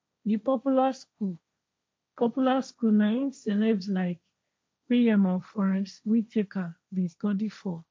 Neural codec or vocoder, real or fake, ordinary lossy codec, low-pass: codec, 16 kHz, 1.1 kbps, Voila-Tokenizer; fake; none; none